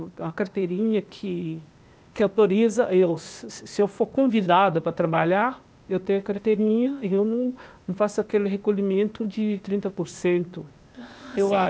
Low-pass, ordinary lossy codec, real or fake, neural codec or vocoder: none; none; fake; codec, 16 kHz, 0.8 kbps, ZipCodec